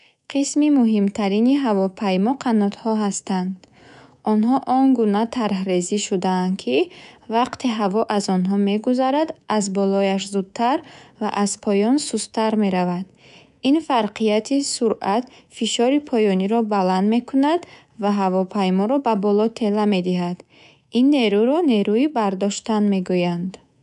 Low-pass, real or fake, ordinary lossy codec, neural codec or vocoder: 10.8 kHz; fake; none; codec, 24 kHz, 3.1 kbps, DualCodec